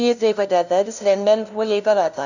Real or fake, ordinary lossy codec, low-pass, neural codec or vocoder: fake; none; 7.2 kHz; codec, 16 kHz, 0.5 kbps, FunCodec, trained on LibriTTS, 25 frames a second